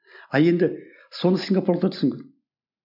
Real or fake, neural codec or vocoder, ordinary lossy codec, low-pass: real; none; none; 5.4 kHz